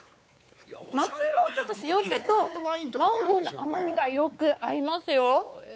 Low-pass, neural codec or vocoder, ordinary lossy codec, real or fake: none; codec, 16 kHz, 4 kbps, X-Codec, WavLM features, trained on Multilingual LibriSpeech; none; fake